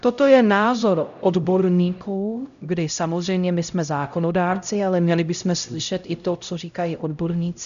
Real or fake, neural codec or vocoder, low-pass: fake; codec, 16 kHz, 0.5 kbps, X-Codec, HuBERT features, trained on LibriSpeech; 7.2 kHz